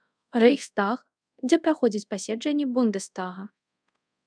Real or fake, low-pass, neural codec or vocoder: fake; 9.9 kHz; codec, 24 kHz, 0.5 kbps, DualCodec